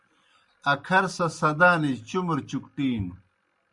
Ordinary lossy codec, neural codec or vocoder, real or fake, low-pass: Opus, 64 kbps; none; real; 10.8 kHz